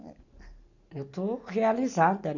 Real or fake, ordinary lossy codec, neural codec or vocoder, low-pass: real; none; none; 7.2 kHz